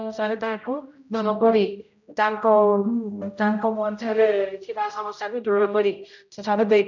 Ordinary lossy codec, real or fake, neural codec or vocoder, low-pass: none; fake; codec, 16 kHz, 0.5 kbps, X-Codec, HuBERT features, trained on general audio; 7.2 kHz